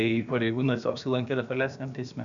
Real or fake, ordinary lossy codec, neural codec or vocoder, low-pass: fake; AAC, 64 kbps; codec, 16 kHz, about 1 kbps, DyCAST, with the encoder's durations; 7.2 kHz